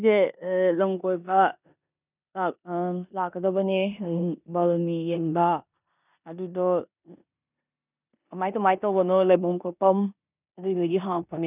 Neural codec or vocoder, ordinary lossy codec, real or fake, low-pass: codec, 16 kHz in and 24 kHz out, 0.9 kbps, LongCat-Audio-Codec, four codebook decoder; none; fake; 3.6 kHz